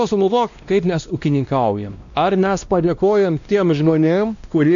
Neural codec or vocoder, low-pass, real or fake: codec, 16 kHz, 1 kbps, X-Codec, WavLM features, trained on Multilingual LibriSpeech; 7.2 kHz; fake